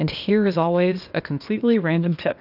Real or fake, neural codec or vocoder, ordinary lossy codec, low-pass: fake; codec, 16 kHz, 0.8 kbps, ZipCodec; AAC, 48 kbps; 5.4 kHz